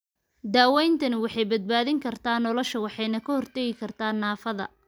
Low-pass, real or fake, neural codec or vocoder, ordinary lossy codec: none; real; none; none